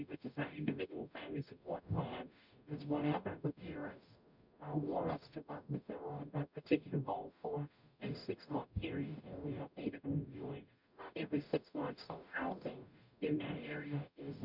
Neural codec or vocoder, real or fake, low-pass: codec, 44.1 kHz, 0.9 kbps, DAC; fake; 5.4 kHz